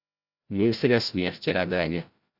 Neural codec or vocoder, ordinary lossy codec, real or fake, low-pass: codec, 16 kHz, 0.5 kbps, FreqCodec, larger model; Opus, 64 kbps; fake; 5.4 kHz